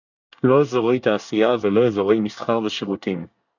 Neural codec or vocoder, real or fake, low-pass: codec, 24 kHz, 1 kbps, SNAC; fake; 7.2 kHz